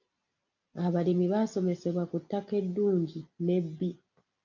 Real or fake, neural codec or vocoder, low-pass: real; none; 7.2 kHz